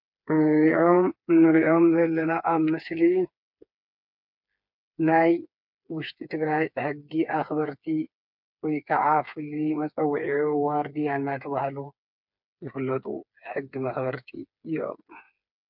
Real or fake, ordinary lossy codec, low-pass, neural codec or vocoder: fake; MP3, 48 kbps; 5.4 kHz; codec, 16 kHz, 4 kbps, FreqCodec, smaller model